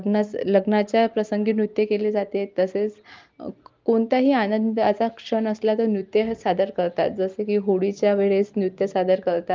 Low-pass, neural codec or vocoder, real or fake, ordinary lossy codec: 7.2 kHz; none; real; Opus, 32 kbps